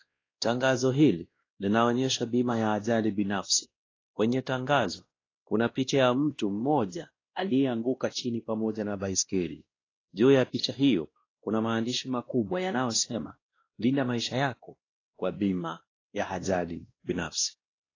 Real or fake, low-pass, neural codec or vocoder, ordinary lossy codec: fake; 7.2 kHz; codec, 16 kHz, 1 kbps, X-Codec, WavLM features, trained on Multilingual LibriSpeech; AAC, 32 kbps